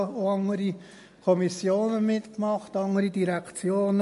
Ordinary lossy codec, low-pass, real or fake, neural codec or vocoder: MP3, 48 kbps; 14.4 kHz; real; none